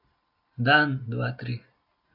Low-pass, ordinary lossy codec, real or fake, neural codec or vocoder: 5.4 kHz; none; real; none